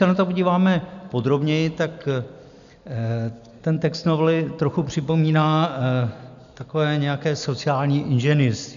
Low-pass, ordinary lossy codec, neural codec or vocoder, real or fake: 7.2 kHz; MP3, 96 kbps; none; real